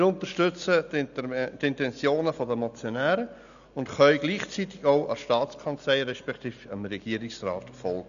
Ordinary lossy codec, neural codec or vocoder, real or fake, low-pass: none; none; real; 7.2 kHz